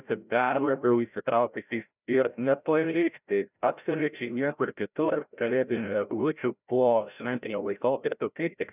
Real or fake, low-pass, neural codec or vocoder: fake; 3.6 kHz; codec, 16 kHz, 0.5 kbps, FreqCodec, larger model